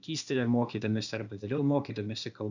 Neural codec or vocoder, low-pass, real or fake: codec, 16 kHz, 0.8 kbps, ZipCodec; 7.2 kHz; fake